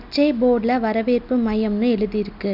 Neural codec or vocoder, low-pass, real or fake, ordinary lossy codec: none; 5.4 kHz; real; none